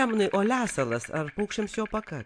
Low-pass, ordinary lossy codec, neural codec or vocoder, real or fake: 9.9 kHz; AAC, 64 kbps; none; real